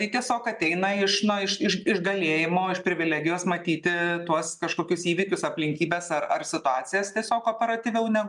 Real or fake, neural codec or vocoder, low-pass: real; none; 10.8 kHz